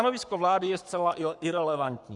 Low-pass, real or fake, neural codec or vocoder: 10.8 kHz; fake; codec, 44.1 kHz, 7.8 kbps, Pupu-Codec